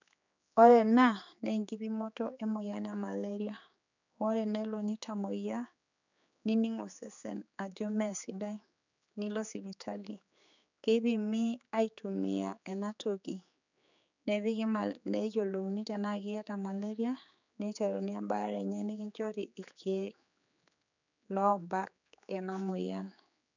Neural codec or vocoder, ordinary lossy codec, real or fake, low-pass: codec, 16 kHz, 4 kbps, X-Codec, HuBERT features, trained on general audio; none; fake; 7.2 kHz